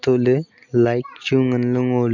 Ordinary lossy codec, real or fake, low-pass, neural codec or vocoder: none; real; 7.2 kHz; none